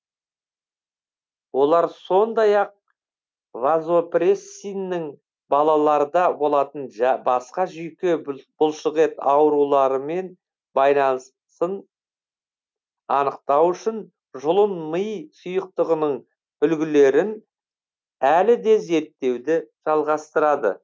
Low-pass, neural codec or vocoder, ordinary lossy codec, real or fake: none; none; none; real